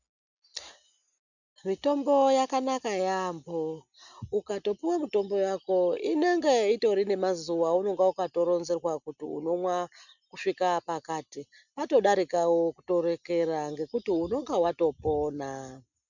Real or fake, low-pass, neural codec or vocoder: real; 7.2 kHz; none